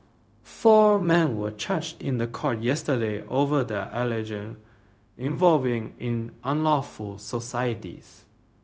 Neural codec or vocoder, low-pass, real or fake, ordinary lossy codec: codec, 16 kHz, 0.4 kbps, LongCat-Audio-Codec; none; fake; none